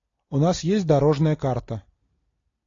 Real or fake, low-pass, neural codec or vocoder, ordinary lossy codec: real; 7.2 kHz; none; AAC, 32 kbps